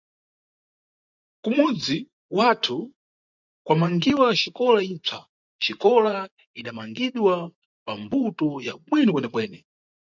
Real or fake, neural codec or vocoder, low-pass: fake; vocoder, 24 kHz, 100 mel bands, Vocos; 7.2 kHz